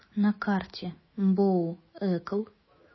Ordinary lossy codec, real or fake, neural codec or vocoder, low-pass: MP3, 24 kbps; fake; autoencoder, 48 kHz, 128 numbers a frame, DAC-VAE, trained on Japanese speech; 7.2 kHz